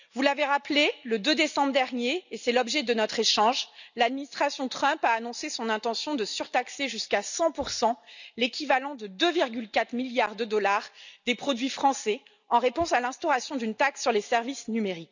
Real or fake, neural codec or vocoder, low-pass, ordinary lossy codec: real; none; 7.2 kHz; none